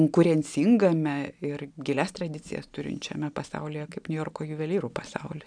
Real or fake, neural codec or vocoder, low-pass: real; none; 9.9 kHz